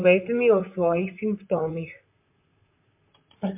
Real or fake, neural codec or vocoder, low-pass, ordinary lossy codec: fake; vocoder, 44.1 kHz, 128 mel bands, Pupu-Vocoder; 3.6 kHz; MP3, 32 kbps